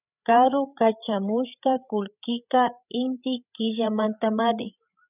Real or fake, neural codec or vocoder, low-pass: fake; codec, 16 kHz, 8 kbps, FreqCodec, larger model; 3.6 kHz